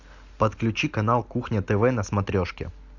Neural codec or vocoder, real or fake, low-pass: none; real; 7.2 kHz